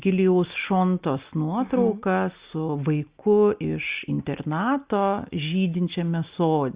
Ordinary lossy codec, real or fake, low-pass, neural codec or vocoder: Opus, 64 kbps; real; 3.6 kHz; none